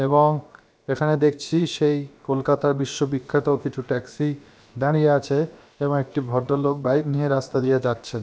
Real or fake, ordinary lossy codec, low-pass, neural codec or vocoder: fake; none; none; codec, 16 kHz, about 1 kbps, DyCAST, with the encoder's durations